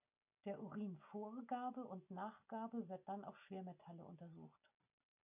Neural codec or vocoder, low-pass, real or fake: none; 3.6 kHz; real